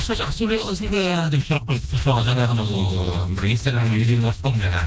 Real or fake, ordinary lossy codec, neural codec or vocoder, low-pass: fake; none; codec, 16 kHz, 1 kbps, FreqCodec, smaller model; none